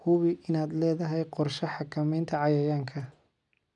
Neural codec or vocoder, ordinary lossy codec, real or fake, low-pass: none; none; real; 10.8 kHz